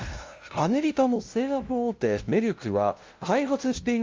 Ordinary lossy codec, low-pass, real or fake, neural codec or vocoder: Opus, 32 kbps; 7.2 kHz; fake; codec, 16 kHz, 0.5 kbps, FunCodec, trained on LibriTTS, 25 frames a second